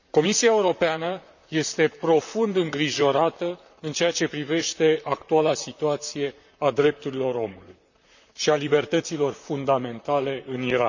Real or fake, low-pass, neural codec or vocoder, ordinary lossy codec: fake; 7.2 kHz; vocoder, 44.1 kHz, 128 mel bands, Pupu-Vocoder; none